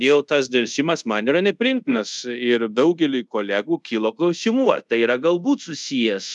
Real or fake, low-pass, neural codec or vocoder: fake; 10.8 kHz; codec, 24 kHz, 0.5 kbps, DualCodec